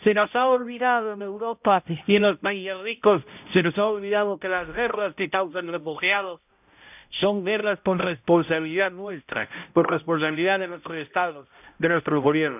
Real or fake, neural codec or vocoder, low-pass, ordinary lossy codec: fake; codec, 16 kHz, 0.5 kbps, X-Codec, HuBERT features, trained on balanced general audio; 3.6 kHz; none